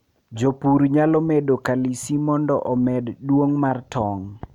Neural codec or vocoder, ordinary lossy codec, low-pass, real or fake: none; none; 19.8 kHz; real